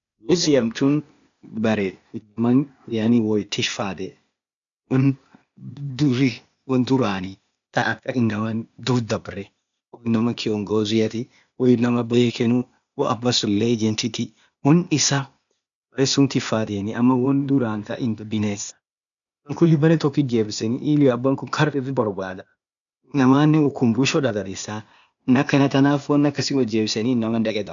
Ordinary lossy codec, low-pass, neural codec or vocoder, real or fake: MP3, 96 kbps; 7.2 kHz; codec, 16 kHz, 0.8 kbps, ZipCodec; fake